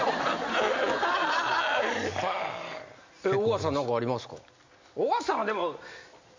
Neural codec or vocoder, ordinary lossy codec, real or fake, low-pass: none; MP3, 64 kbps; real; 7.2 kHz